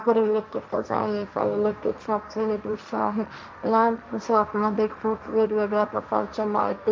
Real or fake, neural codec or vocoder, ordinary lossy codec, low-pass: fake; codec, 16 kHz, 1.1 kbps, Voila-Tokenizer; none; none